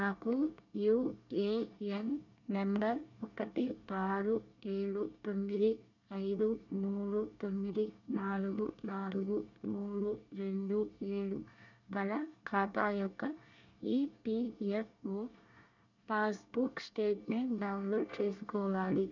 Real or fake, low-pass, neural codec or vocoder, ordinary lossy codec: fake; 7.2 kHz; codec, 24 kHz, 1 kbps, SNAC; none